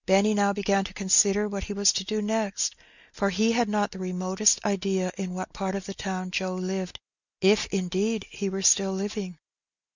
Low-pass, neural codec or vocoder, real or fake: 7.2 kHz; none; real